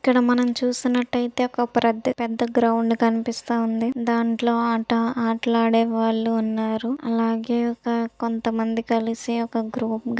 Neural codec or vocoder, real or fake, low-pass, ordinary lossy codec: none; real; none; none